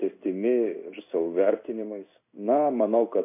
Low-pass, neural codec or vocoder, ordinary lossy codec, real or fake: 3.6 kHz; codec, 16 kHz in and 24 kHz out, 1 kbps, XY-Tokenizer; MP3, 32 kbps; fake